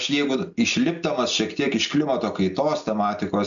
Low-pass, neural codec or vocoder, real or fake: 7.2 kHz; none; real